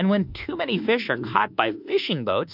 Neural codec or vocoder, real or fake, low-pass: codec, 24 kHz, 1.2 kbps, DualCodec; fake; 5.4 kHz